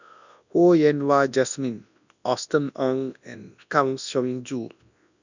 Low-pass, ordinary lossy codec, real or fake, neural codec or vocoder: 7.2 kHz; none; fake; codec, 24 kHz, 0.9 kbps, WavTokenizer, large speech release